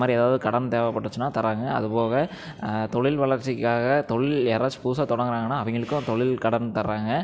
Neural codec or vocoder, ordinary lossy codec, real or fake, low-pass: none; none; real; none